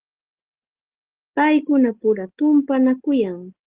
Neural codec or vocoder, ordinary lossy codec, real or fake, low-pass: none; Opus, 16 kbps; real; 3.6 kHz